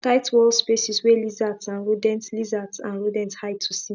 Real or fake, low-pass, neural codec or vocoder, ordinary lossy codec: real; 7.2 kHz; none; none